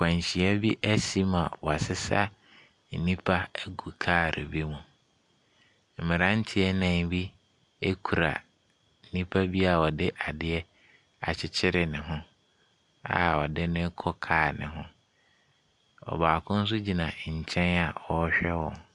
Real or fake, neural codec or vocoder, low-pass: real; none; 10.8 kHz